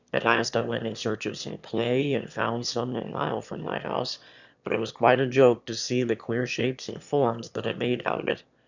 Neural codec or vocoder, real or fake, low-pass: autoencoder, 22.05 kHz, a latent of 192 numbers a frame, VITS, trained on one speaker; fake; 7.2 kHz